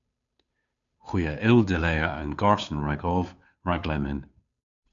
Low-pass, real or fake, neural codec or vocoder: 7.2 kHz; fake; codec, 16 kHz, 2 kbps, FunCodec, trained on Chinese and English, 25 frames a second